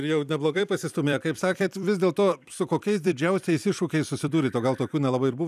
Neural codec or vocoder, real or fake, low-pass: vocoder, 44.1 kHz, 128 mel bands every 256 samples, BigVGAN v2; fake; 14.4 kHz